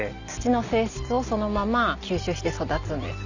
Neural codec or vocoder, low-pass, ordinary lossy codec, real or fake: none; 7.2 kHz; none; real